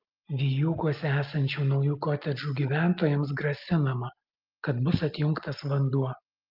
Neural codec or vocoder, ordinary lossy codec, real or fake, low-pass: none; Opus, 24 kbps; real; 5.4 kHz